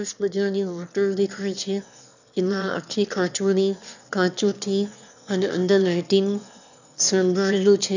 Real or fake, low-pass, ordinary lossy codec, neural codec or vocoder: fake; 7.2 kHz; none; autoencoder, 22.05 kHz, a latent of 192 numbers a frame, VITS, trained on one speaker